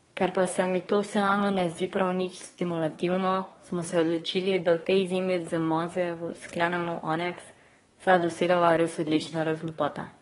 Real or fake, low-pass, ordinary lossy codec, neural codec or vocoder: fake; 10.8 kHz; AAC, 32 kbps; codec, 24 kHz, 1 kbps, SNAC